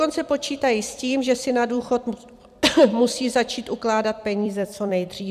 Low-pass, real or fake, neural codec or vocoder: 14.4 kHz; fake; vocoder, 44.1 kHz, 128 mel bands every 256 samples, BigVGAN v2